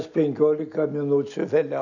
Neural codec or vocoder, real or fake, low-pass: none; real; 7.2 kHz